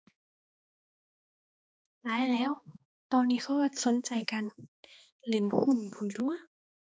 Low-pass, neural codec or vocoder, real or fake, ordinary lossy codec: none; codec, 16 kHz, 2 kbps, X-Codec, HuBERT features, trained on balanced general audio; fake; none